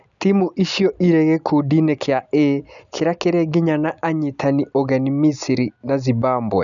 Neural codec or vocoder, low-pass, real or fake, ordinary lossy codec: none; 7.2 kHz; real; none